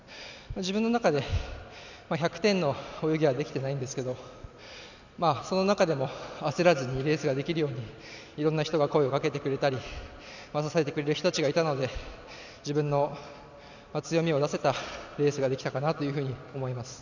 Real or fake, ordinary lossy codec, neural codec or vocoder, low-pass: real; none; none; 7.2 kHz